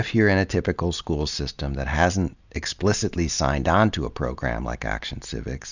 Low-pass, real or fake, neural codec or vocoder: 7.2 kHz; real; none